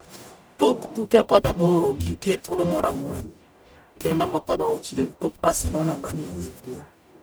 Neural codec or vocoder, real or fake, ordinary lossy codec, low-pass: codec, 44.1 kHz, 0.9 kbps, DAC; fake; none; none